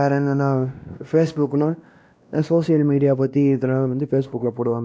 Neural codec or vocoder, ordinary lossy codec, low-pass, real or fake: codec, 16 kHz, 1 kbps, X-Codec, WavLM features, trained on Multilingual LibriSpeech; none; none; fake